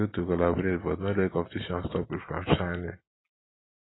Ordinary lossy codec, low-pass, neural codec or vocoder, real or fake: AAC, 16 kbps; 7.2 kHz; none; real